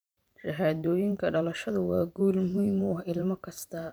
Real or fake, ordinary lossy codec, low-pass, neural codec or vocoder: fake; none; none; vocoder, 44.1 kHz, 128 mel bands every 512 samples, BigVGAN v2